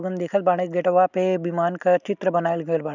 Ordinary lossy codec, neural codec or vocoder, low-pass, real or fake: none; none; 7.2 kHz; real